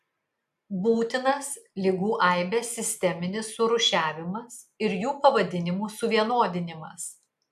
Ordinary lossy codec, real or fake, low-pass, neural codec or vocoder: AAC, 96 kbps; real; 14.4 kHz; none